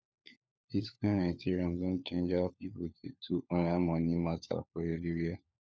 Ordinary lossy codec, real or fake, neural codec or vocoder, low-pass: none; fake; codec, 16 kHz, 4 kbps, FunCodec, trained on LibriTTS, 50 frames a second; none